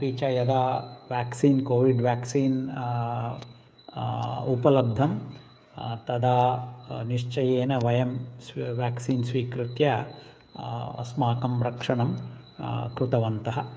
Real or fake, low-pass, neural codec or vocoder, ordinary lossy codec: fake; none; codec, 16 kHz, 8 kbps, FreqCodec, smaller model; none